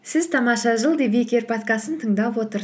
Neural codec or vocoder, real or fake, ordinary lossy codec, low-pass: none; real; none; none